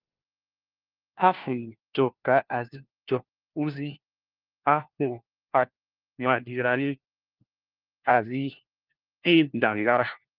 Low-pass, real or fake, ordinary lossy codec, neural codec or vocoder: 5.4 kHz; fake; Opus, 32 kbps; codec, 16 kHz, 1 kbps, FunCodec, trained on LibriTTS, 50 frames a second